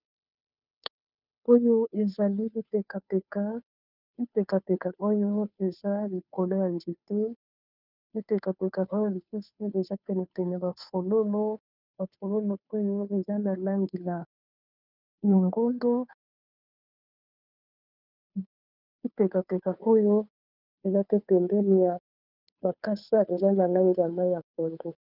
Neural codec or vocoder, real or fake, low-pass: codec, 16 kHz, 2 kbps, FunCodec, trained on Chinese and English, 25 frames a second; fake; 5.4 kHz